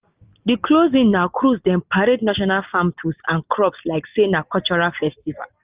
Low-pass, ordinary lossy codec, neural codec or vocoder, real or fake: 3.6 kHz; Opus, 24 kbps; none; real